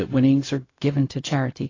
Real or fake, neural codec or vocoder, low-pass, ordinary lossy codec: fake; codec, 16 kHz, 0.4 kbps, LongCat-Audio-Codec; 7.2 kHz; AAC, 32 kbps